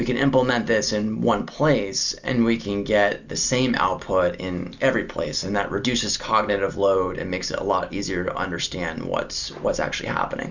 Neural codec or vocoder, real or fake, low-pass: none; real; 7.2 kHz